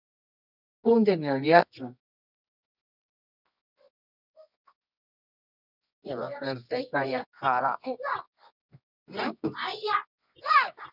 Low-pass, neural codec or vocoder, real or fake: 5.4 kHz; codec, 24 kHz, 0.9 kbps, WavTokenizer, medium music audio release; fake